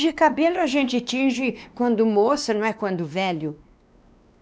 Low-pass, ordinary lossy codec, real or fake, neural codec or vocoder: none; none; fake; codec, 16 kHz, 2 kbps, X-Codec, WavLM features, trained on Multilingual LibriSpeech